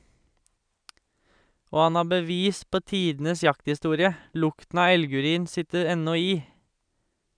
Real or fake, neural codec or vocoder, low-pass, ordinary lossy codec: real; none; 9.9 kHz; none